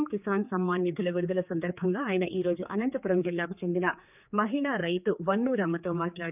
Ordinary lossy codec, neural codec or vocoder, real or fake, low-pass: none; codec, 16 kHz, 4 kbps, X-Codec, HuBERT features, trained on general audio; fake; 3.6 kHz